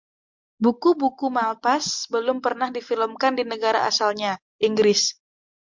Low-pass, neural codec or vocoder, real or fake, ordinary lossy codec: 7.2 kHz; none; real; MP3, 64 kbps